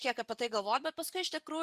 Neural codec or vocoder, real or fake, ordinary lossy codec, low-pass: vocoder, 44.1 kHz, 128 mel bands every 256 samples, BigVGAN v2; fake; Opus, 64 kbps; 14.4 kHz